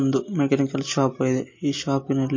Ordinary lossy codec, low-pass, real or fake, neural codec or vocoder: MP3, 32 kbps; 7.2 kHz; real; none